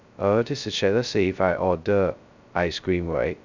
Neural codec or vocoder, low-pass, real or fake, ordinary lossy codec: codec, 16 kHz, 0.2 kbps, FocalCodec; 7.2 kHz; fake; none